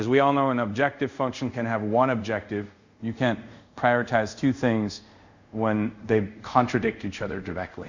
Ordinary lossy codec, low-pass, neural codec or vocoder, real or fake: Opus, 64 kbps; 7.2 kHz; codec, 24 kHz, 0.5 kbps, DualCodec; fake